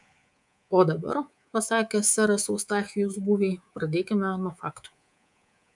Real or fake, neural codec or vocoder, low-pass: fake; codec, 24 kHz, 3.1 kbps, DualCodec; 10.8 kHz